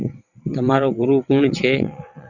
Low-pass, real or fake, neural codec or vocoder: 7.2 kHz; fake; vocoder, 22.05 kHz, 80 mel bands, WaveNeXt